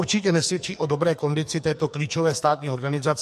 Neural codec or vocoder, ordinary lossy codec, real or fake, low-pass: codec, 44.1 kHz, 2.6 kbps, SNAC; MP3, 64 kbps; fake; 14.4 kHz